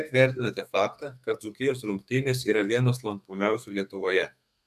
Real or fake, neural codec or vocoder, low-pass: fake; codec, 32 kHz, 1.9 kbps, SNAC; 14.4 kHz